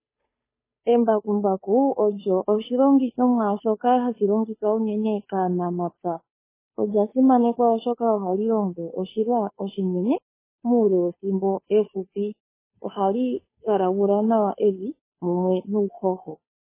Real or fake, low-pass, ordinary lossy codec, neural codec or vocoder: fake; 3.6 kHz; MP3, 16 kbps; codec, 16 kHz, 2 kbps, FunCodec, trained on Chinese and English, 25 frames a second